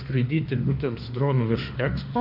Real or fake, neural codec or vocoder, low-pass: fake; autoencoder, 48 kHz, 32 numbers a frame, DAC-VAE, trained on Japanese speech; 5.4 kHz